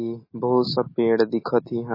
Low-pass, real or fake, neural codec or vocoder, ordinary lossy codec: 5.4 kHz; real; none; MP3, 24 kbps